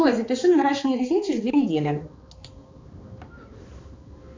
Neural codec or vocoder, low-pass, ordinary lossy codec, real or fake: codec, 16 kHz, 2 kbps, X-Codec, HuBERT features, trained on balanced general audio; 7.2 kHz; MP3, 64 kbps; fake